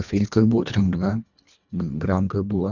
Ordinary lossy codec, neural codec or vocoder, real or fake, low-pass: none; codec, 24 kHz, 1.5 kbps, HILCodec; fake; 7.2 kHz